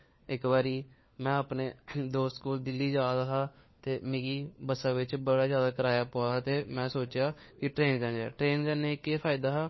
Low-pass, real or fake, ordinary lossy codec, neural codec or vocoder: 7.2 kHz; fake; MP3, 24 kbps; codec, 16 kHz, 4 kbps, FunCodec, trained on LibriTTS, 50 frames a second